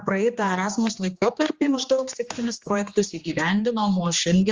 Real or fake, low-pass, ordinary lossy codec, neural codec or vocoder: fake; 7.2 kHz; Opus, 32 kbps; codec, 16 kHz, 2 kbps, X-Codec, HuBERT features, trained on general audio